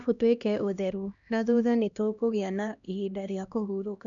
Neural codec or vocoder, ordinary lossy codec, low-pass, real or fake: codec, 16 kHz, 1 kbps, X-Codec, HuBERT features, trained on LibriSpeech; none; 7.2 kHz; fake